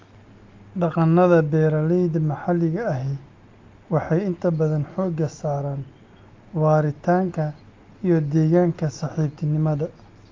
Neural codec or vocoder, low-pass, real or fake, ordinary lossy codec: none; 7.2 kHz; real; Opus, 24 kbps